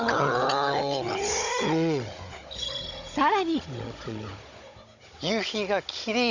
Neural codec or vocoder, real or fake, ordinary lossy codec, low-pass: codec, 16 kHz, 16 kbps, FunCodec, trained on Chinese and English, 50 frames a second; fake; none; 7.2 kHz